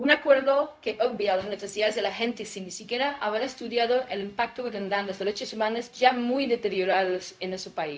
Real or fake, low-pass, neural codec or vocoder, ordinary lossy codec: fake; none; codec, 16 kHz, 0.4 kbps, LongCat-Audio-Codec; none